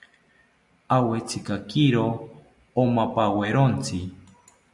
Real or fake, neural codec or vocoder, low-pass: real; none; 10.8 kHz